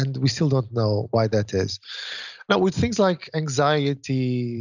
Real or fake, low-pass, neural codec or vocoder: real; 7.2 kHz; none